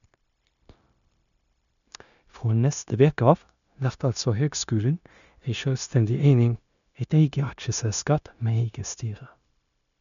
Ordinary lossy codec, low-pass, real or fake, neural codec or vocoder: none; 7.2 kHz; fake; codec, 16 kHz, 0.9 kbps, LongCat-Audio-Codec